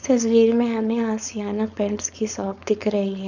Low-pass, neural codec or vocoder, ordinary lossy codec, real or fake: 7.2 kHz; codec, 16 kHz, 4.8 kbps, FACodec; none; fake